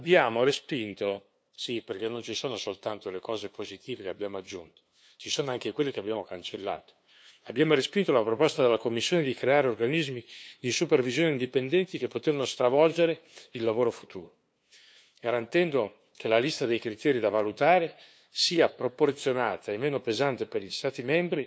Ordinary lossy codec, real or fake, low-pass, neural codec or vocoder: none; fake; none; codec, 16 kHz, 2 kbps, FunCodec, trained on LibriTTS, 25 frames a second